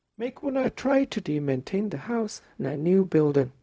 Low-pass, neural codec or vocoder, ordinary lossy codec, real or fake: none; codec, 16 kHz, 0.4 kbps, LongCat-Audio-Codec; none; fake